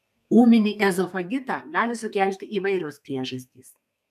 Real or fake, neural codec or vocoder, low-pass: fake; codec, 32 kHz, 1.9 kbps, SNAC; 14.4 kHz